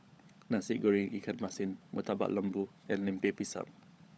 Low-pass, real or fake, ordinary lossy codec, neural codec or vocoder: none; fake; none; codec, 16 kHz, 16 kbps, FunCodec, trained on LibriTTS, 50 frames a second